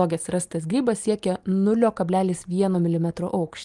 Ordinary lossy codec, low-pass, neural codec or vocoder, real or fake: Opus, 32 kbps; 10.8 kHz; none; real